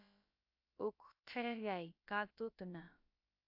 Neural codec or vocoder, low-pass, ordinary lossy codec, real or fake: codec, 16 kHz, about 1 kbps, DyCAST, with the encoder's durations; 5.4 kHz; AAC, 48 kbps; fake